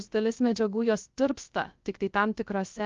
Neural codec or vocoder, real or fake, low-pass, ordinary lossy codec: codec, 16 kHz, 0.3 kbps, FocalCodec; fake; 7.2 kHz; Opus, 32 kbps